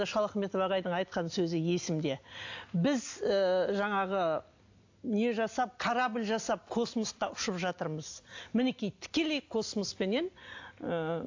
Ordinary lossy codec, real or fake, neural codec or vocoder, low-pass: AAC, 48 kbps; real; none; 7.2 kHz